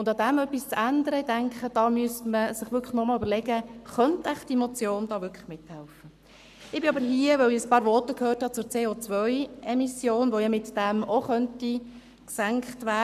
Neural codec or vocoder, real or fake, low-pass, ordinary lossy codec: codec, 44.1 kHz, 7.8 kbps, Pupu-Codec; fake; 14.4 kHz; none